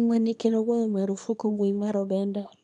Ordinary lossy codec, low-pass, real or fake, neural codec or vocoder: MP3, 96 kbps; 10.8 kHz; fake; codec, 24 kHz, 0.9 kbps, WavTokenizer, small release